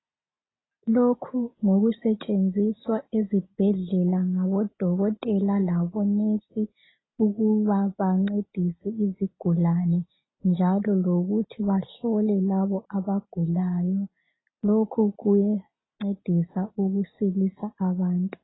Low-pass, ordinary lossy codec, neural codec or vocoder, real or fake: 7.2 kHz; AAC, 16 kbps; none; real